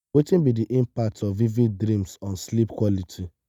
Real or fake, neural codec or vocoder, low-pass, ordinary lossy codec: real; none; 19.8 kHz; none